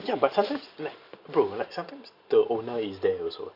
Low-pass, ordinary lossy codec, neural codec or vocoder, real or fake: 5.4 kHz; Opus, 64 kbps; none; real